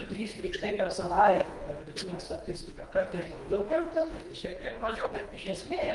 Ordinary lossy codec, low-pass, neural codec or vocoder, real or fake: Opus, 32 kbps; 10.8 kHz; codec, 24 kHz, 1.5 kbps, HILCodec; fake